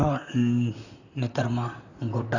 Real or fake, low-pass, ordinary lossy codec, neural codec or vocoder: fake; 7.2 kHz; none; vocoder, 44.1 kHz, 128 mel bands, Pupu-Vocoder